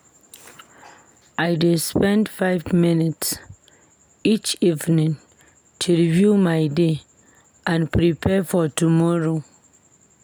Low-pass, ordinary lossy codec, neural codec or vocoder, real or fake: none; none; none; real